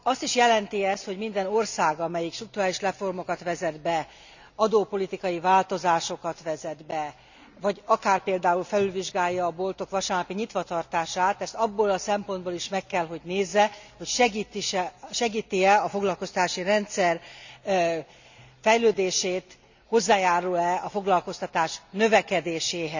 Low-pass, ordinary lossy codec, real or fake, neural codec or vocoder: 7.2 kHz; none; real; none